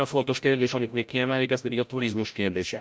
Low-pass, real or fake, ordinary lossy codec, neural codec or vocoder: none; fake; none; codec, 16 kHz, 0.5 kbps, FreqCodec, larger model